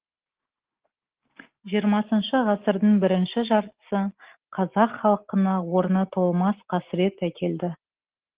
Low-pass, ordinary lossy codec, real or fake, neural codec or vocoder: 3.6 kHz; Opus, 16 kbps; real; none